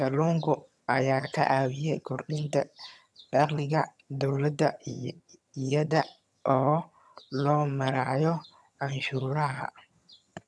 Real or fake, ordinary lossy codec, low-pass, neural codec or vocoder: fake; none; none; vocoder, 22.05 kHz, 80 mel bands, HiFi-GAN